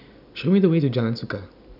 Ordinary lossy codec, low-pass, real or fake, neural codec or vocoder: none; 5.4 kHz; real; none